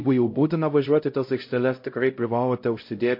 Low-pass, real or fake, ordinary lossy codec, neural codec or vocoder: 5.4 kHz; fake; MP3, 32 kbps; codec, 16 kHz, 0.5 kbps, X-Codec, HuBERT features, trained on LibriSpeech